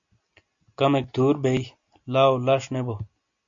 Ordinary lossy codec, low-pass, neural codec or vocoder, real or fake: AAC, 64 kbps; 7.2 kHz; none; real